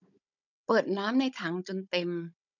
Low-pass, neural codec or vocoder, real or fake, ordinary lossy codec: 7.2 kHz; codec, 16 kHz, 16 kbps, FunCodec, trained on Chinese and English, 50 frames a second; fake; none